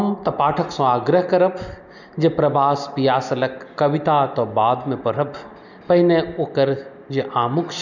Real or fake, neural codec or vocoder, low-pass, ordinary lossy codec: real; none; 7.2 kHz; none